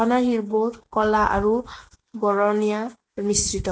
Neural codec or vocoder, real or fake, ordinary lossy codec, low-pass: none; real; none; none